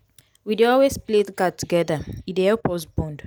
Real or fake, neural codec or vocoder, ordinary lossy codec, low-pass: real; none; none; none